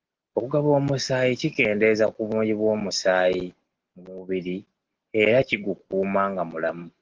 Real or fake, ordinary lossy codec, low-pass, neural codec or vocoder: real; Opus, 16 kbps; 7.2 kHz; none